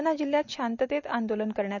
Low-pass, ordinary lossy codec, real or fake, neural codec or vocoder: 7.2 kHz; none; real; none